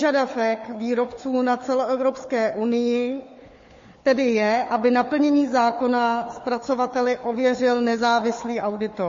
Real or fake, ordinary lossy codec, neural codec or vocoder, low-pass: fake; MP3, 32 kbps; codec, 16 kHz, 4 kbps, FunCodec, trained on Chinese and English, 50 frames a second; 7.2 kHz